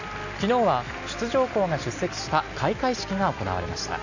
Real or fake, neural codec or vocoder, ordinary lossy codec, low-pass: real; none; none; 7.2 kHz